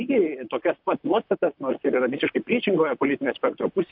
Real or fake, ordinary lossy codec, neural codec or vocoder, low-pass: fake; MP3, 48 kbps; vocoder, 44.1 kHz, 128 mel bands, Pupu-Vocoder; 5.4 kHz